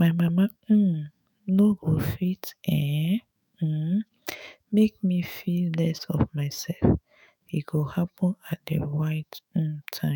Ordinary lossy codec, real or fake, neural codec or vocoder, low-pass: none; fake; autoencoder, 48 kHz, 128 numbers a frame, DAC-VAE, trained on Japanese speech; none